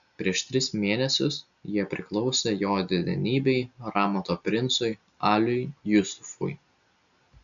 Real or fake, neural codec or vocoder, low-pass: real; none; 7.2 kHz